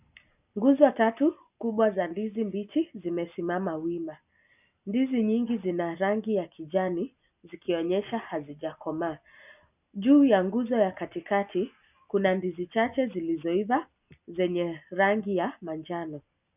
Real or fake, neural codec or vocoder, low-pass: real; none; 3.6 kHz